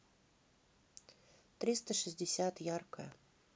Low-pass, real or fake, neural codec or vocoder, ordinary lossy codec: none; real; none; none